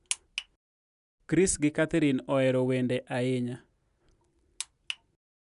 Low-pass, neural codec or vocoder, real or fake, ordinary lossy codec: 10.8 kHz; none; real; none